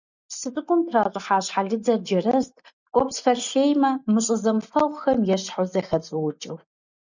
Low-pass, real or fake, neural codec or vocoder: 7.2 kHz; real; none